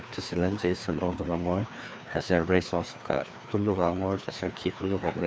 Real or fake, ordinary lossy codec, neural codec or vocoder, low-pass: fake; none; codec, 16 kHz, 2 kbps, FreqCodec, larger model; none